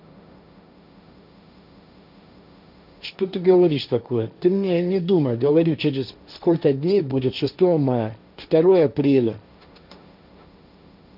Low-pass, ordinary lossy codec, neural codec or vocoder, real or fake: 5.4 kHz; none; codec, 16 kHz, 1.1 kbps, Voila-Tokenizer; fake